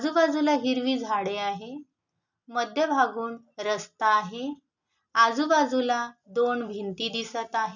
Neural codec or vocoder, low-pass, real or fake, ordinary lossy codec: none; 7.2 kHz; real; none